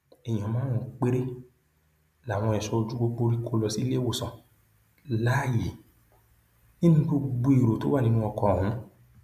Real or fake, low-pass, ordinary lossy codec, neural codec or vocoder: real; 14.4 kHz; none; none